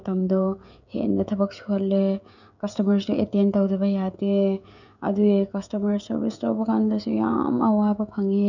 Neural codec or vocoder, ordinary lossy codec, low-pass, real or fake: codec, 16 kHz, 16 kbps, FreqCodec, smaller model; none; 7.2 kHz; fake